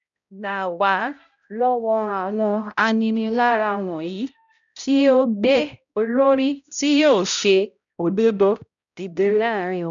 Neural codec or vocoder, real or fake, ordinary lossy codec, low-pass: codec, 16 kHz, 0.5 kbps, X-Codec, HuBERT features, trained on balanced general audio; fake; none; 7.2 kHz